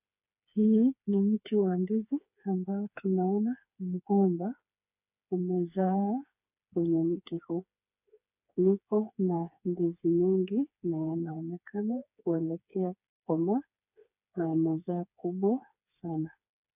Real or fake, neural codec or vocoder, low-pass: fake; codec, 16 kHz, 4 kbps, FreqCodec, smaller model; 3.6 kHz